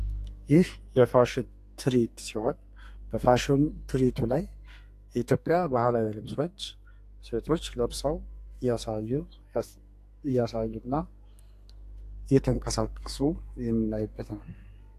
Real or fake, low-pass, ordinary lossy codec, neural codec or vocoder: fake; 14.4 kHz; AAC, 64 kbps; codec, 32 kHz, 1.9 kbps, SNAC